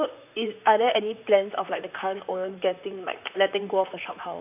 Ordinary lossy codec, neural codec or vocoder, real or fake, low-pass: AAC, 32 kbps; vocoder, 44.1 kHz, 128 mel bands, Pupu-Vocoder; fake; 3.6 kHz